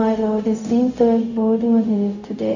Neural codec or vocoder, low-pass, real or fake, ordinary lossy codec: codec, 16 kHz, 0.4 kbps, LongCat-Audio-Codec; 7.2 kHz; fake; none